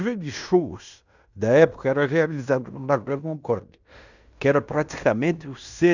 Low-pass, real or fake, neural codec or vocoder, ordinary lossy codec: 7.2 kHz; fake; codec, 16 kHz in and 24 kHz out, 0.9 kbps, LongCat-Audio-Codec, fine tuned four codebook decoder; none